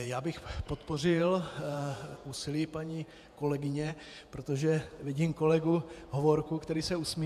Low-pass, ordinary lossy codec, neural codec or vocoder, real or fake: 14.4 kHz; AAC, 96 kbps; vocoder, 48 kHz, 128 mel bands, Vocos; fake